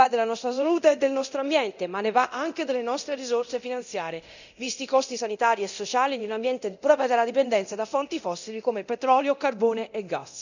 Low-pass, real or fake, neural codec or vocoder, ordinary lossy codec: 7.2 kHz; fake; codec, 24 kHz, 0.9 kbps, DualCodec; none